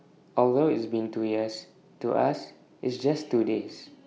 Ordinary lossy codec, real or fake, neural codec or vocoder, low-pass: none; real; none; none